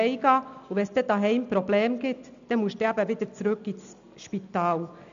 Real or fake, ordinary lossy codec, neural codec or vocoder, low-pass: real; none; none; 7.2 kHz